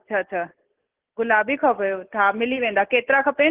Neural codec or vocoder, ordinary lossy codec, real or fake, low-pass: none; Opus, 16 kbps; real; 3.6 kHz